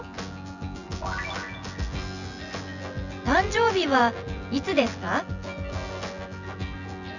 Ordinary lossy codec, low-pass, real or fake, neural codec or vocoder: none; 7.2 kHz; fake; vocoder, 24 kHz, 100 mel bands, Vocos